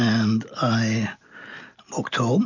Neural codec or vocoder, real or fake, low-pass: none; real; 7.2 kHz